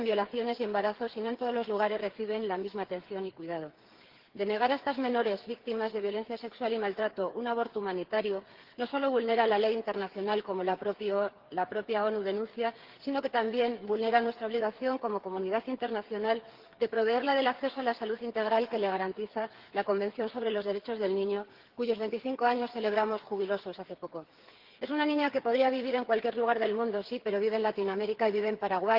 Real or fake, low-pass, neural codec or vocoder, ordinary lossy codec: fake; 5.4 kHz; codec, 16 kHz, 8 kbps, FreqCodec, smaller model; Opus, 16 kbps